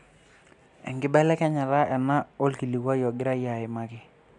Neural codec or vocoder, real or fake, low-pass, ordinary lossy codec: none; real; 10.8 kHz; none